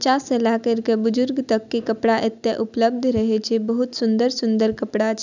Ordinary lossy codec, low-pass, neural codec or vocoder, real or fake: none; 7.2 kHz; none; real